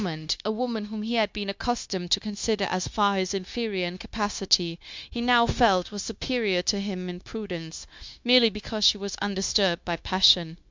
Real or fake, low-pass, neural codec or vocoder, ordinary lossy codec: fake; 7.2 kHz; codec, 16 kHz, 0.9 kbps, LongCat-Audio-Codec; MP3, 64 kbps